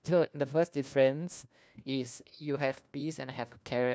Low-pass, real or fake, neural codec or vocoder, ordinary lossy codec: none; fake; codec, 16 kHz, 1 kbps, FunCodec, trained on LibriTTS, 50 frames a second; none